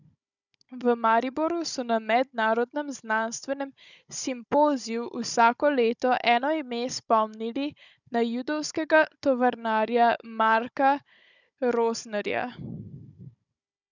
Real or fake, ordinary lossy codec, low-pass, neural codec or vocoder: fake; none; 7.2 kHz; codec, 16 kHz, 16 kbps, FunCodec, trained on Chinese and English, 50 frames a second